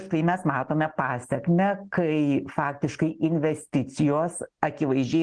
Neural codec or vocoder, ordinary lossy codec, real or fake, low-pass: none; Opus, 16 kbps; real; 9.9 kHz